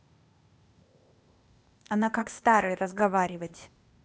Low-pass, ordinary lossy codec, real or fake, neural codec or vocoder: none; none; fake; codec, 16 kHz, 0.8 kbps, ZipCodec